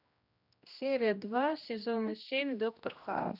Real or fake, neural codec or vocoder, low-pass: fake; codec, 16 kHz, 1 kbps, X-Codec, HuBERT features, trained on general audio; 5.4 kHz